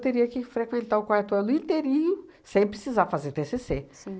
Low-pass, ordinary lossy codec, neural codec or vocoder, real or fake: none; none; none; real